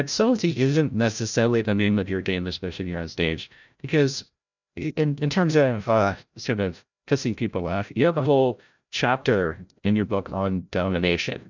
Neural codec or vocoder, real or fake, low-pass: codec, 16 kHz, 0.5 kbps, FreqCodec, larger model; fake; 7.2 kHz